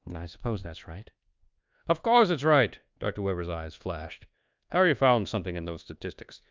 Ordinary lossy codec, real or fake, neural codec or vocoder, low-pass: Opus, 24 kbps; fake; codec, 24 kHz, 1.2 kbps, DualCodec; 7.2 kHz